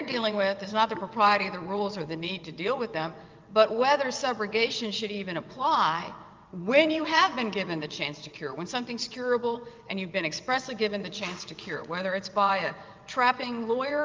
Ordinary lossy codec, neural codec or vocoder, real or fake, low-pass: Opus, 24 kbps; vocoder, 22.05 kHz, 80 mel bands, WaveNeXt; fake; 7.2 kHz